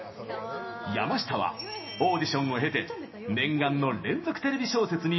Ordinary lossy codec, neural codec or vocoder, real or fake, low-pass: MP3, 24 kbps; none; real; 7.2 kHz